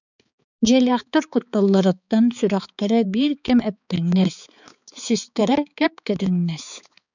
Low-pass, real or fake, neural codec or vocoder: 7.2 kHz; fake; codec, 16 kHz, 4 kbps, X-Codec, HuBERT features, trained on balanced general audio